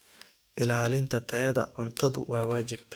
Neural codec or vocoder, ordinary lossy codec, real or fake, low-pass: codec, 44.1 kHz, 2.6 kbps, DAC; none; fake; none